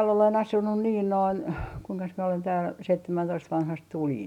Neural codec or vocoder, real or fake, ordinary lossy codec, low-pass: none; real; none; 19.8 kHz